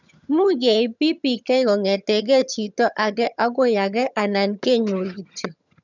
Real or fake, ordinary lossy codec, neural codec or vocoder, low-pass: fake; none; vocoder, 22.05 kHz, 80 mel bands, HiFi-GAN; 7.2 kHz